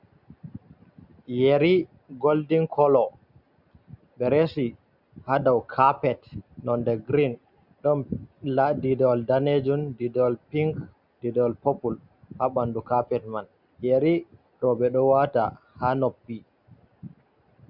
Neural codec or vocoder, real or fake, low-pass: none; real; 5.4 kHz